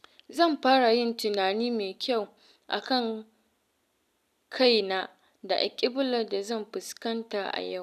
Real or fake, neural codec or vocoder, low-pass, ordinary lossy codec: real; none; 14.4 kHz; none